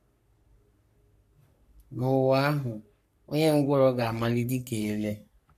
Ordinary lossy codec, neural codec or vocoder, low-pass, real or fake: none; codec, 44.1 kHz, 3.4 kbps, Pupu-Codec; 14.4 kHz; fake